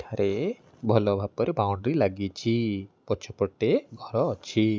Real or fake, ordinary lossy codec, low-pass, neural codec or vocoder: real; none; none; none